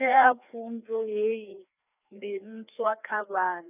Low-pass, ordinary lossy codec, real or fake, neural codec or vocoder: 3.6 kHz; none; fake; codec, 16 kHz, 2 kbps, FreqCodec, larger model